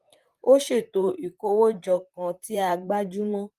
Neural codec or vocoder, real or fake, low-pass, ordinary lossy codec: vocoder, 44.1 kHz, 128 mel bands, Pupu-Vocoder; fake; 14.4 kHz; Opus, 32 kbps